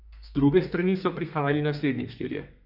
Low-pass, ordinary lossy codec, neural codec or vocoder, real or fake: 5.4 kHz; none; codec, 32 kHz, 1.9 kbps, SNAC; fake